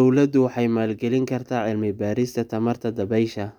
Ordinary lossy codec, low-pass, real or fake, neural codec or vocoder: none; 19.8 kHz; real; none